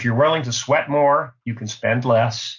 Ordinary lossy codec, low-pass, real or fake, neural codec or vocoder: MP3, 48 kbps; 7.2 kHz; real; none